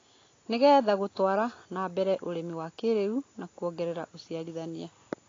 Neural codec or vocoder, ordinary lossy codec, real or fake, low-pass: none; AAC, 32 kbps; real; 7.2 kHz